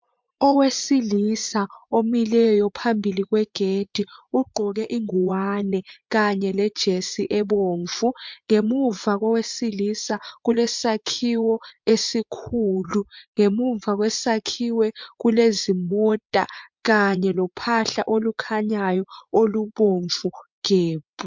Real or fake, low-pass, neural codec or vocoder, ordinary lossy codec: fake; 7.2 kHz; vocoder, 44.1 kHz, 80 mel bands, Vocos; MP3, 64 kbps